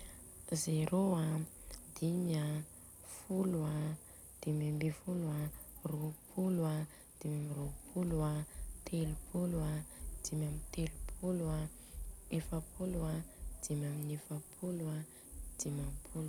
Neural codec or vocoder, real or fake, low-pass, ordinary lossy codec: none; real; none; none